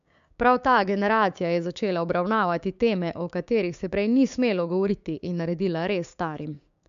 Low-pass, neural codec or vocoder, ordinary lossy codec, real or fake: 7.2 kHz; codec, 16 kHz, 6 kbps, DAC; MP3, 64 kbps; fake